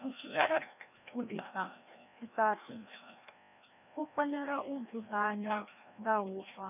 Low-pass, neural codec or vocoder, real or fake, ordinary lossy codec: 3.6 kHz; codec, 16 kHz, 1 kbps, FreqCodec, larger model; fake; none